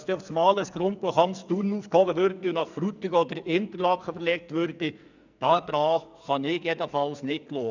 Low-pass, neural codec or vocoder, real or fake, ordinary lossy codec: 7.2 kHz; codec, 44.1 kHz, 2.6 kbps, SNAC; fake; none